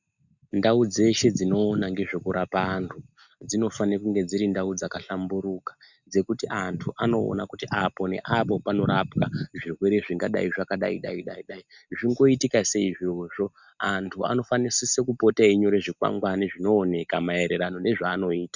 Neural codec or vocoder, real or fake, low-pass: none; real; 7.2 kHz